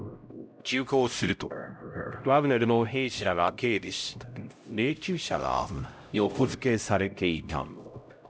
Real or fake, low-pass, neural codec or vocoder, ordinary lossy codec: fake; none; codec, 16 kHz, 0.5 kbps, X-Codec, HuBERT features, trained on LibriSpeech; none